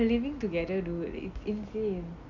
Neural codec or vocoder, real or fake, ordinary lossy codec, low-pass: none; real; none; 7.2 kHz